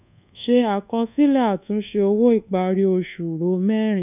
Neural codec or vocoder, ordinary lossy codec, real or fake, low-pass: codec, 24 kHz, 1.2 kbps, DualCodec; none; fake; 3.6 kHz